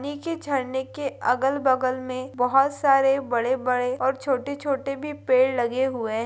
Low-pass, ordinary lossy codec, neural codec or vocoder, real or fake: none; none; none; real